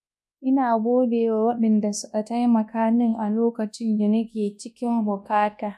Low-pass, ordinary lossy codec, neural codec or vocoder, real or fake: none; none; codec, 24 kHz, 0.9 kbps, WavTokenizer, large speech release; fake